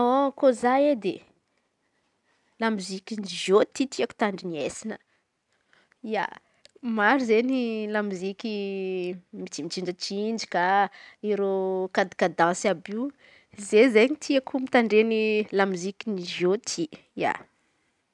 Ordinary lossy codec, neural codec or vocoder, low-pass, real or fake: none; none; 10.8 kHz; real